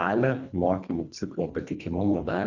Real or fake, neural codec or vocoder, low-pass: fake; codec, 24 kHz, 1.5 kbps, HILCodec; 7.2 kHz